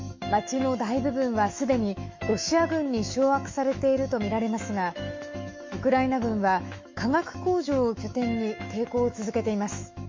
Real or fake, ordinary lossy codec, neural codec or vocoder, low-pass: fake; MP3, 48 kbps; autoencoder, 48 kHz, 128 numbers a frame, DAC-VAE, trained on Japanese speech; 7.2 kHz